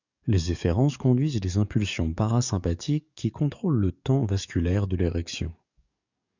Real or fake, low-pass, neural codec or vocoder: fake; 7.2 kHz; codec, 44.1 kHz, 7.8 kbps, DAC